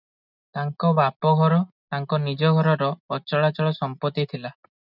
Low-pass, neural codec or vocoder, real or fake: 5.4 kHz; none; real